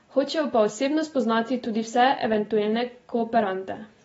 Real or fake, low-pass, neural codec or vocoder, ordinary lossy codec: real; 19.8 kHz; none; AAC, 24 kbps